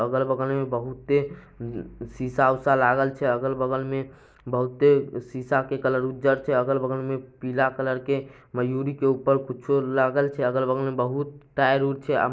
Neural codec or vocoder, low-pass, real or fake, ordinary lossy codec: none; none; real; none